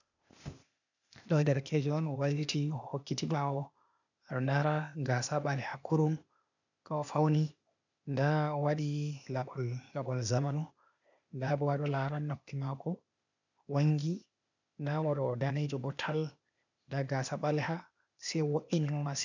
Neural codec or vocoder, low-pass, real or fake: codec, 16 kHz, 0.8 kbps, ZipCodec; 7.2 kHz; fake